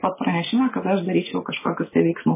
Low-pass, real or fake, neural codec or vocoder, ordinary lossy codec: 3.6 kHz; real; none; MP3, 16 kbps